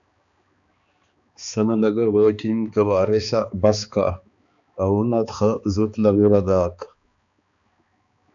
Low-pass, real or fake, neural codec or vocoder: 7.2 kHz; fake; codec, 16 kHz, 2 kbps, X-Codec, HuBERT features, trained on balanced general audio